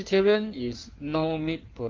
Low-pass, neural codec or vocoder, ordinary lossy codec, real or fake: 7.2 kHz; codec, 16 kHz in and 24 kHz out, 1.1 kbps, FireRedTTS-2 codec; Opus, 32 kbps; fake